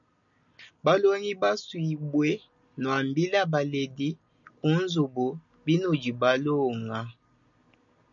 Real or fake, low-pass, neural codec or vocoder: real; 7.2 kHz; none